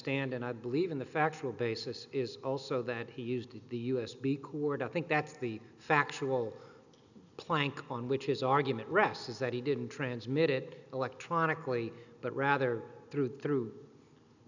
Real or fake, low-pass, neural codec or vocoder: real; 7.2 kHz; none